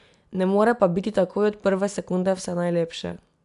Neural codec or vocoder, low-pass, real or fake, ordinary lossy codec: none; 10.8 kHz; real; none